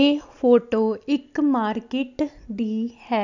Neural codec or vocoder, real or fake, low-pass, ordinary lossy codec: none; real; 7.2 kHz; none